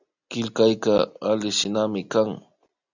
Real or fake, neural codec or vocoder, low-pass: real; none; 7.2 kHz